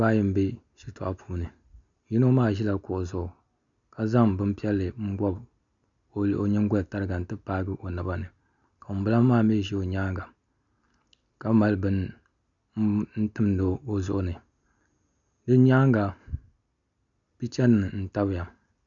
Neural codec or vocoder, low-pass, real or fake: none; 7.2 kHz; real